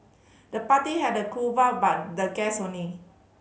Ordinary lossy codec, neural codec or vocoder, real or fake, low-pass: none; none; real; none